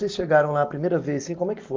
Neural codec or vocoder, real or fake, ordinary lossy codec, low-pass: none; real; Opus, 32 kbps; 7.2 kHz